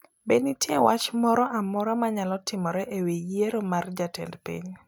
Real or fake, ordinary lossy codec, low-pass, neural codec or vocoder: real; none; none; none